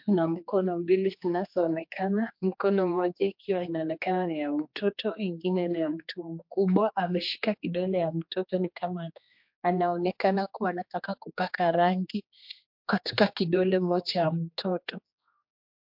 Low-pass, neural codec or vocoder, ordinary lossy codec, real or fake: 5.4 kHz; codec, 16 kHz, 2 kbps, X-Codec, HuBERT features, trained on general audio; MP3, 48 kbps; fake